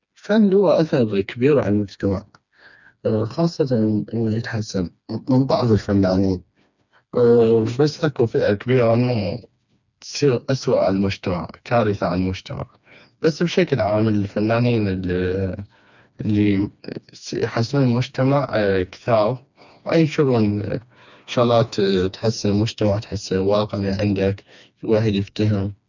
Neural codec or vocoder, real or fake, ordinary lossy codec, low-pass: codec, 16 kHz, 2 kbps, FreqCodec, smaller model; fake; none; 7.2 kHz